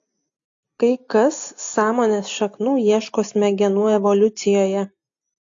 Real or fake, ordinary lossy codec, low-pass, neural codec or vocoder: real; AAC, 48 kbps; 7.2 kHz; none